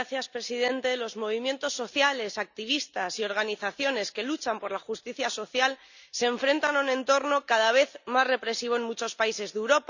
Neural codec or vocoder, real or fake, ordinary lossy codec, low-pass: none; real; none; 7.2 kHz